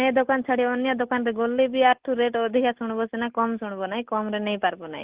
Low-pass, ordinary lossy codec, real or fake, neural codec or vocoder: 3.6 kHz; Opus, 16 kbps; real; none